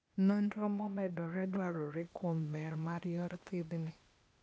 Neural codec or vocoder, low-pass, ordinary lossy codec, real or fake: codec, 16 kHz, 0.8 kbps, ZipCodec; none; none; fake